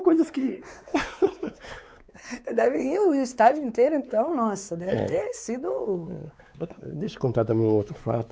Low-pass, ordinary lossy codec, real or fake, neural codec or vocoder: none; none; fake; codec, 16 kHz, 4 kbps, X-Codec, WavLM features, trained on Multilingual LibriSpeech